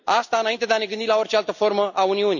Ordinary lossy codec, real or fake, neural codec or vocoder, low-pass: none; real; none; 7.2 kHz